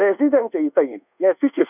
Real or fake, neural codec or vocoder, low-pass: fake; codec, 24 kHz, 1.2 kbps, DualCodec; 3.6 kHz